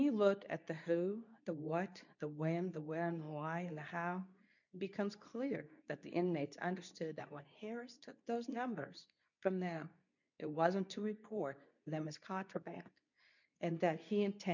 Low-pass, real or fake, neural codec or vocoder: 7.2 kHz; fake; codec, 24 kHz, 0.9 kbps, WavTokenizer, medium speech release version 2